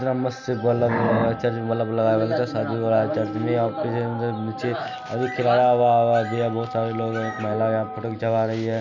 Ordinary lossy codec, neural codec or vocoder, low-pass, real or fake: none; none; 7.2 kHz; real